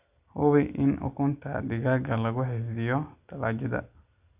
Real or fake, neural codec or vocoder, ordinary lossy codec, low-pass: real; none; Opus, 64 kbps; 3.6 kHz